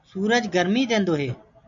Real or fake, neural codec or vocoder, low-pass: real; none; 7.2 kHz